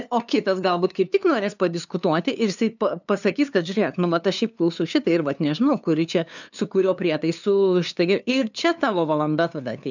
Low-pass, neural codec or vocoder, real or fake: 7.2 kHz; codec, 16 kHz, 2 kbps, FunCodec, trained on LibriTTS, 25 frames a second; fake